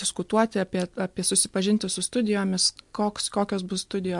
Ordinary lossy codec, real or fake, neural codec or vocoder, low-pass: MP3, 64 kbps; real; none; 10.8 kHz